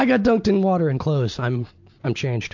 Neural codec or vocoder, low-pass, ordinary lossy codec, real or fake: none; 7.2 kHz; MP3, 64 kbps; real